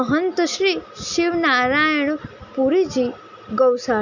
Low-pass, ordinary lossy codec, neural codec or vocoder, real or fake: 7.2 kHz; none; none; real